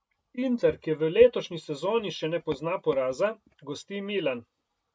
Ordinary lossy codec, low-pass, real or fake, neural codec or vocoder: none; none; real; none